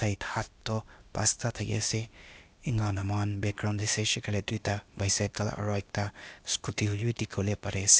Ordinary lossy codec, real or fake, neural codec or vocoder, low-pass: none; fake; codec, 16 kHz, 0.8 kbps, ZipCodec; none